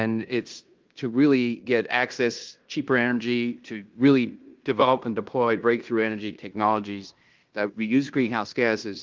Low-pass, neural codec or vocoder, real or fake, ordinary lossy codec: 7.2 kHz; codec, 16 kHz in and 24 kHz out, 0.9 kbps, LongCat-Audio-Codec, fine tuned four codebook decoder; fake; Opus, 32 kbps